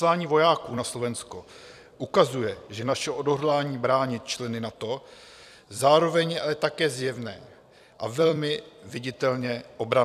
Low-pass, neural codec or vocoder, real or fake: 14.4 kHz; vocoder, 44.1 kHz, 128 mel bands every 256 samples, BigVGAN v2; fake